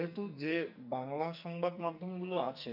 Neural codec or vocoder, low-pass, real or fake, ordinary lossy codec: codec, 44.1 kHz, 2.6 kbps, SNAC; 5.4 kHz; fake; none